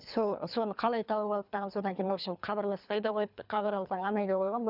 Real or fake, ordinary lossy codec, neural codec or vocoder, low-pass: fake; none; codec, 24 kHz, 3 kbps, HILCodec; 5.4 kHz